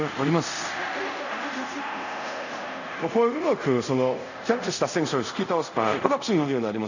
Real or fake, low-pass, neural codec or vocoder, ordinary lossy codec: fake; 7.2 kHz; codec, 24 kHz, 0.5 kbps, DualCodec; none